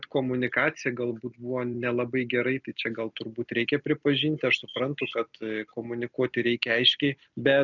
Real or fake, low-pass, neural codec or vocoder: real; 7.2 kHz; none